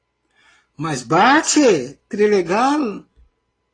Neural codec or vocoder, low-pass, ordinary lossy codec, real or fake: none; 9.9 kHz; AAC, 32 kbps; real